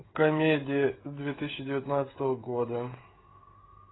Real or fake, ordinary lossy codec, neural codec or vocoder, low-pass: fake; AAC, 16 kbps; codec, 16 kHz, 16 kbps, FreqCodec, smaller model; 7.2 kHz